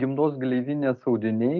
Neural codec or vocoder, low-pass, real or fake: none; 7.2 kHz; real